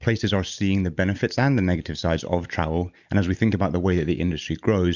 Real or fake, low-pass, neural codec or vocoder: real; 7.2 kHz; none